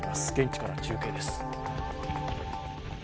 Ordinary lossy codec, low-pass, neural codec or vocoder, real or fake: none; none; none; real